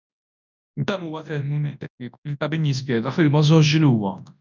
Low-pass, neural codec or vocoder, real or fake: 7.2 kHz; codec, 24 kHz, 0.9 kbps, WavTokenizer, large speech release; fake